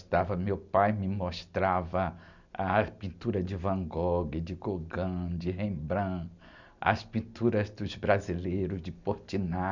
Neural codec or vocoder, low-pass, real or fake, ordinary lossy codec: none; 7.2 kHz; real; none